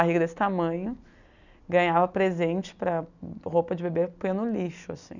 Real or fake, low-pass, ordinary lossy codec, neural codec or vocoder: real; 7.2 kHz; none; none